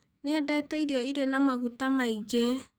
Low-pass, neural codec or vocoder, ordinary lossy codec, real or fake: none; codec, 44.1 kHz, 2.6 kbps, SNAC; none; fake